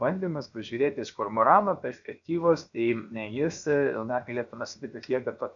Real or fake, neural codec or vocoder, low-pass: fake; codec, 16 kHz, 0.7 kbps, FocalCodec; 7.2 kHz